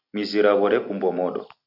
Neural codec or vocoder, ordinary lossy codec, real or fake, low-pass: none; AAC, 48 kbps; real; 5.4 kHz